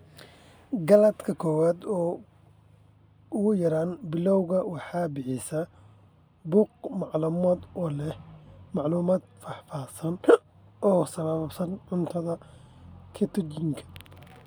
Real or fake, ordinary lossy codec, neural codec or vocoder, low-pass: real; none; none; none